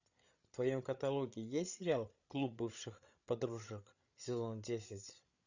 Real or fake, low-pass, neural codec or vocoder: fake; 7.2 kHz; codec, 16 kHz, 8 kbps, FreqCodec, larger model